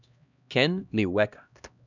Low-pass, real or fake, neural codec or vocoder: 7.2 kHz; fake; codec, 16 kHz, 1 kbps, X-Codec, HuBERT features, trained on LibriSpeech